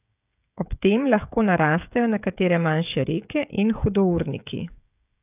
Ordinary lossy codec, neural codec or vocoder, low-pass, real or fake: none; codec, 16 kHz, 16 kbps, FreqCodec, smaller model; 3.6 kHz; fake